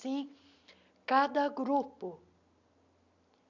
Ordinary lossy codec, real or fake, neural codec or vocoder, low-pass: none; real; none; 7.2 kHz